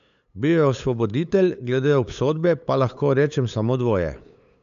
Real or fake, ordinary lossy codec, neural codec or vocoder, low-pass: fake; none; codec, 16 kHz, 8 kbps, FunCodec, trained on LibriTTS, 25 frames a second; 7.2 kHz